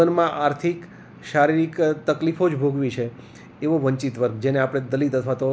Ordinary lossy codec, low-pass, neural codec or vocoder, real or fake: none; none; none; real